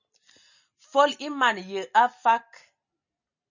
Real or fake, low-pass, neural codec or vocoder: real; 7.2 kHz; none